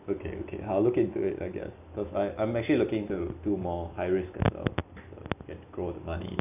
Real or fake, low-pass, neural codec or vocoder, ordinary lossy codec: real; 3.6 kHz; none; none